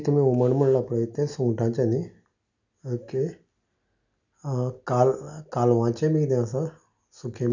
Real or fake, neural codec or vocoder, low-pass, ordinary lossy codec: real; none; 7.2 kHz; none